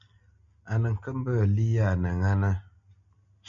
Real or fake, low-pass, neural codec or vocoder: real; 7.2 kHz; none